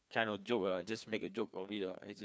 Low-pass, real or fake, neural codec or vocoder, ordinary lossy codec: none; fake; codec, 16 kHz, 2 kbps, FreqCodec, larger model; none